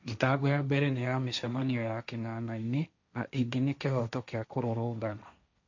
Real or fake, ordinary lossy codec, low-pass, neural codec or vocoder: fake; none; 7.2 kHz; codec, 16 kHz, 1.1 kbps, Voila-Tokenizer